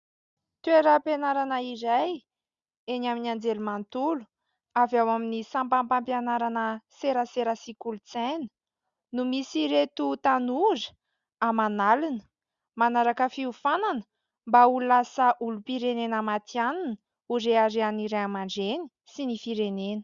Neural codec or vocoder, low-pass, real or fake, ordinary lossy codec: none; 7.2 kHz; real; Opus, 64 kbps